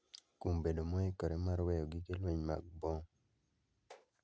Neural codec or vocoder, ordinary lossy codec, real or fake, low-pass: none; none; real; none